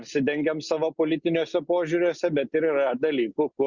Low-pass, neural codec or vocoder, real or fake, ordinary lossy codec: 7.2 kHz; none; real; Opus, 64 kbps